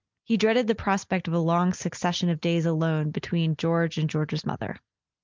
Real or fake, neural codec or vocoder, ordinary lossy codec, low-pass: real; none; Opus, 24 kbps; 7.2 kHz